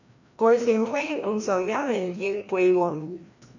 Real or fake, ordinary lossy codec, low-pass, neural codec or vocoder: fake; none; 7.2 kHz; codec, 16 kHz, 1 kbps, FreqCodec, larger model